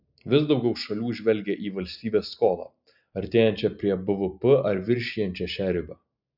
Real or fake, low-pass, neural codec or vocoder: real; 5.4 kHz; none